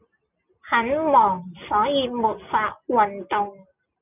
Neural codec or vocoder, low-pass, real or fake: none; 3.6 kHz; real